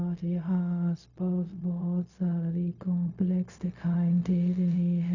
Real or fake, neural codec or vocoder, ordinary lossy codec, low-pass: fake; codec, 16 kHz, 0.4 kbps, LongCat-Audio-Codec; none; 7.2 kHz